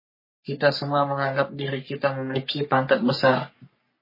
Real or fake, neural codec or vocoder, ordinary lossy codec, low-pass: fake; codec, 44.1 kHz, 3.4 kbps, Pupu-Codec; MP3, 24 kbps; 5.4 kHz